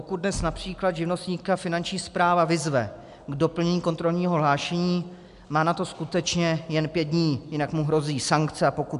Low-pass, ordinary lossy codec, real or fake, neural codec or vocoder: 10.8 kHz; AAC, 96 kbps; real; none